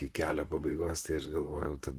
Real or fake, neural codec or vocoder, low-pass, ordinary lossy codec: fake; vocoder, 44.1 kHz, 128 mel bands, Pupu-Vocoder; 14.4 kHz; Opus, 24 kbps